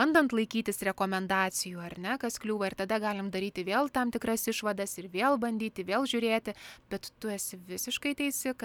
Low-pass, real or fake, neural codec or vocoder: 19.8 kHz; real; none